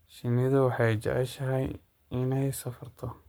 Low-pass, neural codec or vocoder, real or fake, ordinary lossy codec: none; codec, 44.1 kHz, 7.8 kbps, Pupu-Codec; fake; none